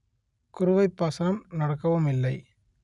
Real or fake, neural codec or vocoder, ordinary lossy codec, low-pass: real; none; none; 10.8 kHz